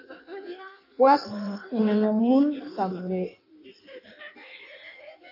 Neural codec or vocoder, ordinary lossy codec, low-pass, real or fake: codec, 16 kHz in and 24 kHz out, 1.1 kbps, FireRedTTS-2 codec; AAC, 32 kbps; 5.4 kHz; fake